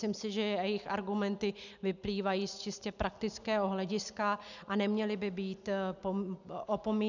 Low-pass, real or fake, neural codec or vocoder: 7.2 kHz; real; none